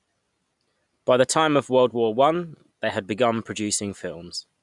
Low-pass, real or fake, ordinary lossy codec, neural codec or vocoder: 10.8 kHz; real; none; none